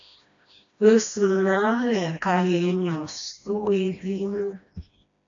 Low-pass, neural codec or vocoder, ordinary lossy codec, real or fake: 7.2 kHz; codec, 16 kHz, 1 kbps, FreqCodec, smaller model; AAC, 64 kbps; fake